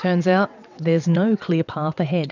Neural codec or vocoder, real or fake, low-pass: vocoder, 22.05 kHz, 80 mel bands, WaveNeXt; fake; 7.2 kHz